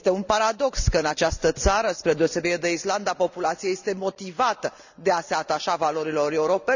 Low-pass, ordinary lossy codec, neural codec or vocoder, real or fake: 7.2 kHz; none; none; real